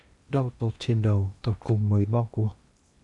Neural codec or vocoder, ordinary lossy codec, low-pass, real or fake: codec, 16 kHz in and 24 kHz out, 0.8 kbps, FocalCodec, streaming, 65536 codes; MP3, 96 kbps; 10.8 kHz; fake